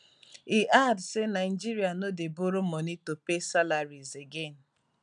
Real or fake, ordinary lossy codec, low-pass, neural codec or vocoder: real; none; 9.9 kHz; none